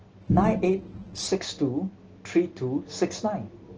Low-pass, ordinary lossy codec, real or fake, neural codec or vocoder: 7.2 kHz; Opus, 16 kbps; real; none